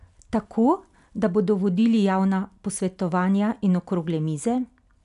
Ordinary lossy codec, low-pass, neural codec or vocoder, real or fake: none; 10.8 kHz; none; real